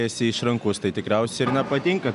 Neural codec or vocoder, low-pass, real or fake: none; 10.8 kHz; real